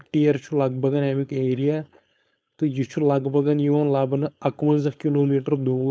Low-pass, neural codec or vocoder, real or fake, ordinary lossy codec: none; codec, 16 kHz, 4.8 kbps, FACodec; fake; none